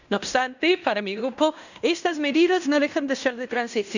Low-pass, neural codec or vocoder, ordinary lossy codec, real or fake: 7.2 kHz; codec, 16 kHz in and 24 kHz out, 0.9 kbps, LongCat-Audio-Codec, fine tuned four codebook decoder; none; fake